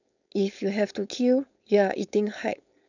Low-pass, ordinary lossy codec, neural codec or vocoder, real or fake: 7.2 kHz; none; codec, 16 kHz, 4.8 kbps, FACodec; fake